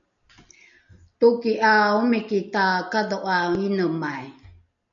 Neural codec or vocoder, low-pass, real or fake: none; 7.2 kHz; real